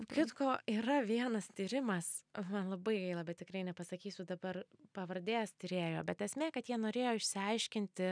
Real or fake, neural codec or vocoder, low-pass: real; none; 9.9 kHz